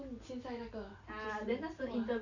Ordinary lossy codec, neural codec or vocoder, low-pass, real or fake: none; none; 7.2 kHz; real